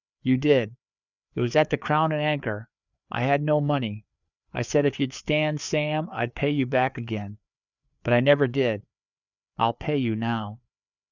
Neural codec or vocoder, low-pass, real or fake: codec, 16 kHz, 4 kbps, FreqCodec, larger model; 7.2 kHz; fake